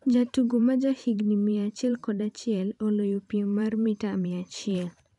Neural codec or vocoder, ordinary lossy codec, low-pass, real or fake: vocoder, 44.1 kHz, 128 mel bands every 512 samples, BigVGAN v2; none; 10.8 kHz; fake